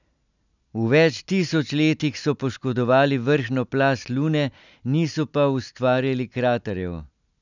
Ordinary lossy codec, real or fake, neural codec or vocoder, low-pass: none; real; none; 7.2 kHz